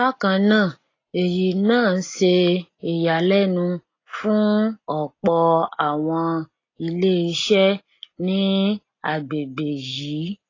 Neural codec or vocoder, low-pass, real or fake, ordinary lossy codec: none; 7.2 kHz; real; AAC, 32 kbps